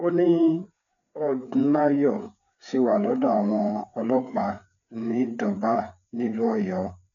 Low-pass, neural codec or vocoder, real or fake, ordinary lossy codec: 7.2 kHz; codec, 16 kHz, 4 kbps, FreqCodec, larger model; fake; none